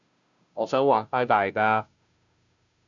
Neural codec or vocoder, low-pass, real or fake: codec, 16 kHz, 0.5 kbps, FunCodec, trained on Chinese and English, 25 frames a second; 7.2 kHz; fake